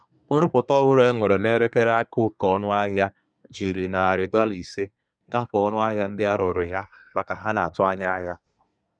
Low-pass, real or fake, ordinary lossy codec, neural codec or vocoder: 9.9 kHz; fake; none; codec, 24 kHz, 1 kbps, SNAC